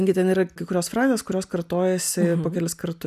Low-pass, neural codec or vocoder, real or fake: 14.4 kHz; none; real